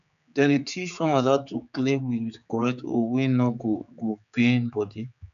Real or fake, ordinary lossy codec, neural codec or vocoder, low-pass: fake; none; codec, 16 kHz, 4 kbps, X-Codec, HuBERT features, trained on general audio; 7.2 kHz